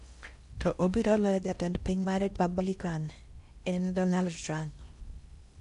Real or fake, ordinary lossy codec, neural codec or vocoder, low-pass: fake; none; codec, 16 kHz in and 24 kHz out, 0.6 kbps, FocalCodec, streaming, 4096 codes; 10.8 kHz